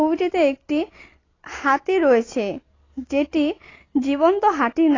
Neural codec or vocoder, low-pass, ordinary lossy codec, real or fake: none; 7.2 kHz; AAC, 32 kbps; real